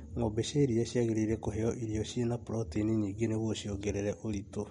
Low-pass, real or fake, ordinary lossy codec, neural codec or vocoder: 14.4 kHz; real; MP3, 48 kbps; none